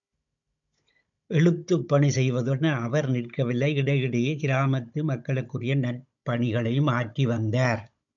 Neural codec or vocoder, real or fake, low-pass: codec, 16 kHz, 16 kbps, FunCodec, trained on Chinese and English, 50 frames a second; fake; 7.2 kHz